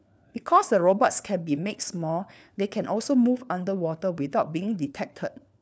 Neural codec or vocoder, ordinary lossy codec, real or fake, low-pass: codec, 16 kHz, 4 kbps, FunCodec, trained on LibriTTS, 50 frames a second; none; fake; none